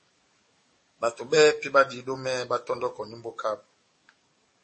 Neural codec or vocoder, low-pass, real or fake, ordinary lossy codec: codec, 44.1 kHz, 7.8 kbps, DAC; 10.8 kHz; fake; MP3, 32 kbps